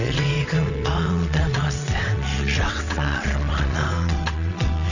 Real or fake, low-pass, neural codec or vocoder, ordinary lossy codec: fake; 7.2 kHz; vocoder, 22.05 kHz, 80 mel bands, WaveNeXt; none